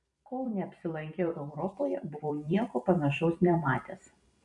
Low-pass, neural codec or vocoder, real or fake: 10.8 kHz; vocoder, 44.1 kHz, 128 mel bands every 256 samples, BigVGAN v2; fake